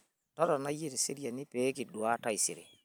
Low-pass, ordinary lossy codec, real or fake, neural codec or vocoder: none; none; real; none